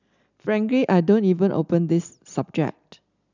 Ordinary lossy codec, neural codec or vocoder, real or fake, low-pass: none; none; real; 7.2 kHz